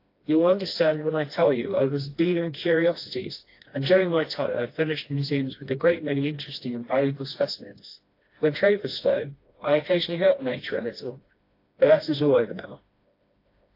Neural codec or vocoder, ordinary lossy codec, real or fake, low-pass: codec, 16 kHz, 1 kbps, FreqCodec, smaller model; AAC, 32 kbps; fake; 5.4 kHz